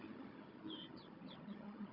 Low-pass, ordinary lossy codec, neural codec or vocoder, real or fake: 5.4 kHz; Opus, 64 kbps; codec, 16 kHz, 16 kbps, FunCodec, trained on Chinese and English, 50 frames a second; fake